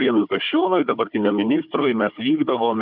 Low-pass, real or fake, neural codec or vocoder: 5.4 kHz; fake; codec, 16 kHz, 4 kbps, FunCodec, trained on Chinese and English, 50 frames a second